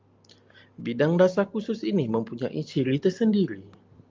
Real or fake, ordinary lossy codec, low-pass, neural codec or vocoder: real; Opus, 32 kbps; 7.2 kHz; none